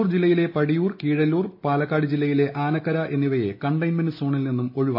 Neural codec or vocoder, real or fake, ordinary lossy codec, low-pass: none; real; MP3, 32 kbps; 5.4 kHz